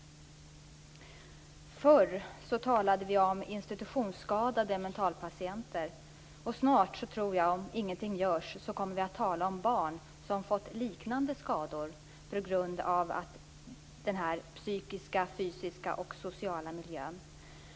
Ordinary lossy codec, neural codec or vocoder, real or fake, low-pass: none; none; real; none